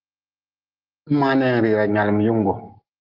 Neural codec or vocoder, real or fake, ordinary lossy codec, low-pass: none; real; Opus, 16 kbps; 5.4 kHz